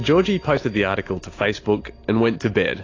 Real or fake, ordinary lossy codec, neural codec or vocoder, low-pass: real; AAC, 32 kbps; none; 7.2 kHz